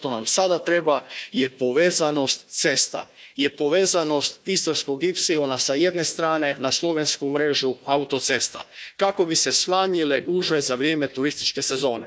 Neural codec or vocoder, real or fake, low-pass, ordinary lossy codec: codec, 16 kHz, 1 kbps, FunCodec, trained on Chinese and English, 50 frames a second; fake; none; none